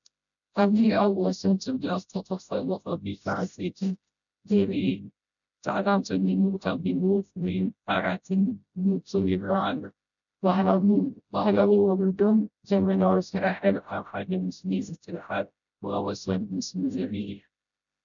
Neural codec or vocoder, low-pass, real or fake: codec, 16 kHz, 0.5 kbps, FreqCodec, smaller model; 7.2 kHz; fake